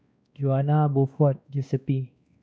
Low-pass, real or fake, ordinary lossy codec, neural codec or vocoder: none; fake; none; codec, 16 kHz, 2 kbps, X-Codec, WavLM features, trained on Multilingual LibriSpeech